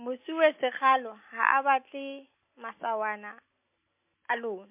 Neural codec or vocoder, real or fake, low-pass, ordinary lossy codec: none; real; 3.6 kHz; MP3, 24 kbps